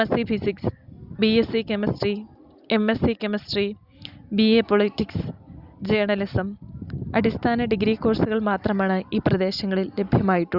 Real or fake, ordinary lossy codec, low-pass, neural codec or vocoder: real; Opus, 64 kbps; 5.4 kHz; none